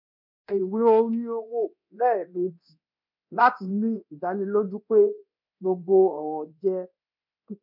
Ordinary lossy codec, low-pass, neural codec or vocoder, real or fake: MP3, 48 kbps; 5.4 kHz; codec, 24 kHz, 0.9 kbps, DualCodec; fake